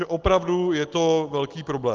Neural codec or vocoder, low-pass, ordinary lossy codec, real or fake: none; 7.2 kHz; Opus, 16 kbps; real